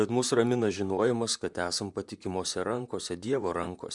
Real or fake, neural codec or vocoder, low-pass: fake; vocoder, 44.1 kHz, 128 mel bands, Pupu-Vocoder; 10.8 kHz